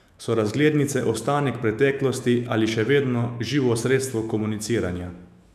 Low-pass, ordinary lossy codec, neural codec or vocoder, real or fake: 14.4 kHz; none; autoencoder, 48 kHz, 128 numbers a frame, DAC-VAE, trained on Japanese speech; fake